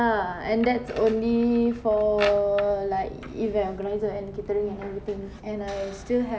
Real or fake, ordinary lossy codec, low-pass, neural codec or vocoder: real; none; none; none